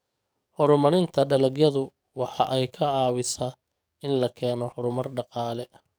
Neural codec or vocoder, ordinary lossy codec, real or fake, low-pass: codec, 44.1 kHz, 7.8 kbps, DAC; none; fake; none